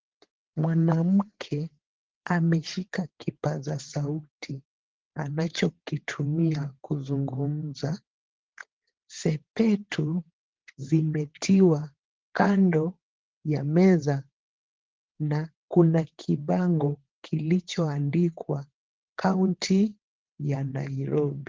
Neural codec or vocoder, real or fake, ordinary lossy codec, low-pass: vocoder, 22.05 kHz, 80 mel bands, WaveNeXt; fake; Opus, 16 kbps; 7.2 kHz